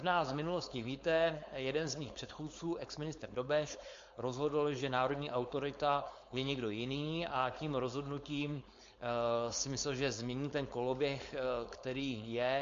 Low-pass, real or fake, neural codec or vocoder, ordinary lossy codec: 7.2 kHz; fake; codec, 16 kHz, 4.8 kbps, FACodec; MP3, 48 kbps